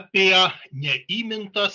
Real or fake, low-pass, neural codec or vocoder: real; 7.2 kHz; none